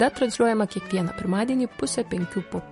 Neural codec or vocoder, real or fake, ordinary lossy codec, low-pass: none; real; MP3, 48 kbps; 14.4 kHz